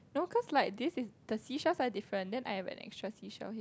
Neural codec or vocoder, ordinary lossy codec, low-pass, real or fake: none; none; none; real